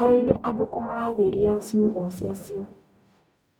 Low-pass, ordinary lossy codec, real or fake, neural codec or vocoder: none; none; fake; codec, 44.1 kHz, 0.9 kbps, DAC